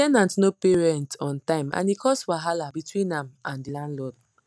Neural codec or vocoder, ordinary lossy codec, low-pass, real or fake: none; none; none; real